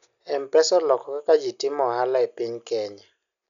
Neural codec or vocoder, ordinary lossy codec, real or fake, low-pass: none; MP3, 96 kbps; real; 7.2 kHz